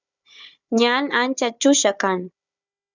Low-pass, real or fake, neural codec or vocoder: 7.2 kHz; fake; codec, 16 kHz, 4 kbps, FunCodec, trained on Chinese and English, 50 frames a second